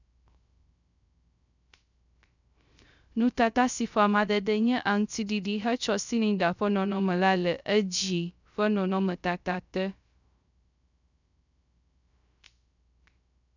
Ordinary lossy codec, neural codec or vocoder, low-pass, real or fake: none; codec, 16 kHz, 0.3 kbps, FocalCodec; 7.2 kHz; fake